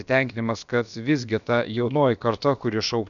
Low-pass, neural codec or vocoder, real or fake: 7.2 kHz; codec, 16 kHz, about 1 kbps, DyCAST, with the encoder's durations; fake